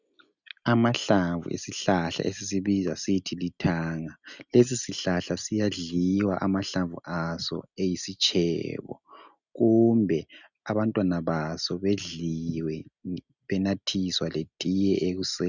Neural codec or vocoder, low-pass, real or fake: none; 7.2 kHz; real